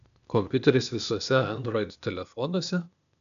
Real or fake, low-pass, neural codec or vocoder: fake; 7.2 kHz; codec, 16 kHz, 0.8 kbps, ZipCodec